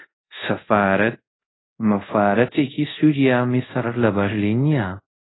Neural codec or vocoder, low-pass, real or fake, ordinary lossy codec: codec, 24 kHz, 0.5 kbps, DualCodec; 7.2 kHz; fake; AAC, 16 kbps